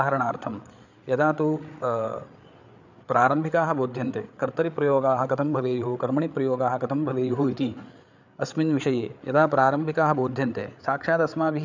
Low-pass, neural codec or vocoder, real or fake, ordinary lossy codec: 7.2 kHz; codec, 16 kHz, 8 kbps, FreqCodec, larger model; fake; none